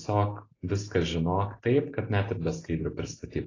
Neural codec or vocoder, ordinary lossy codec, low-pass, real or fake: none; AAC, 32 kbps; 7.2 kHz; real